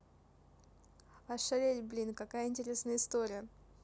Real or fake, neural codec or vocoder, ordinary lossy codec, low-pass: real; none; none; none